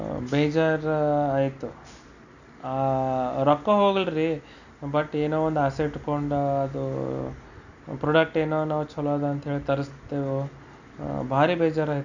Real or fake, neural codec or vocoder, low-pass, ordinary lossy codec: real; none; 7.2 kHz; none